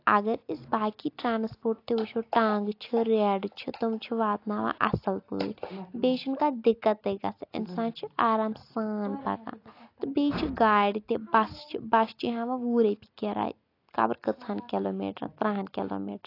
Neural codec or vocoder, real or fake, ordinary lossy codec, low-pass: none; real; AAC, 32 kbps; 5.4 kHz